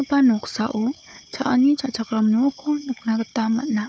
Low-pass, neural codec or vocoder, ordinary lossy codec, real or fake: none; codec, 16 kHz, 4 kbps, FunCodec, trained on Chinese and English, 50 frames a second; none; fake